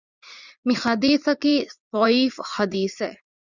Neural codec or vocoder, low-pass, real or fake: vocoder, 44.1 kHz, 80 mel bands, Vocos; 7.2 kHz; fake